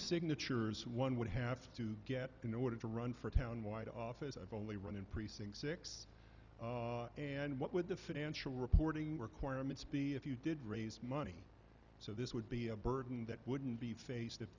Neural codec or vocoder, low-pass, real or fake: none; 7.2 kHz; real